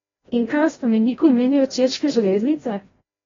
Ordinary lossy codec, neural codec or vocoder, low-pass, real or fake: AAC, 24 kbps; codec, 16 kHz, 0.5 kbps, FreqCodec, larger model; 7.2 kHz; fake